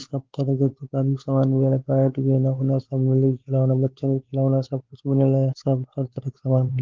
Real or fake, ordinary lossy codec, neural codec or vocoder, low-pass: fake; Opus, 16 kbps; codec, 16 kHz, 4 kbps, X-Codec, WavLM features, trained on Multilingual LibriSpeech; 7.2 kHz